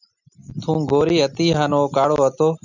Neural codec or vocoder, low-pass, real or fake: none; 7.2 kHz; real